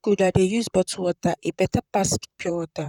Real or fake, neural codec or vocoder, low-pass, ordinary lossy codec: fake; vocoder, 44.1 kHz, 128 mel bands, Pupu-Vocoder; 19.8 kHz; none